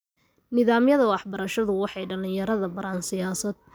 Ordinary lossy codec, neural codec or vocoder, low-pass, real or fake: none; none; none; real